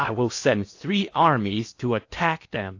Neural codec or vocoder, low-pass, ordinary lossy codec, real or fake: codec, 16 kHz in and 24 kHz out, 0.8 kbps, FocalCodec, streaming, 65536 codes; 7.2 kHz; AAC, 48 kbps; fake